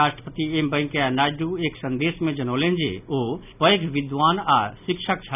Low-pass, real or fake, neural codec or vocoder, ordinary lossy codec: 3.6 kHz; real; none; none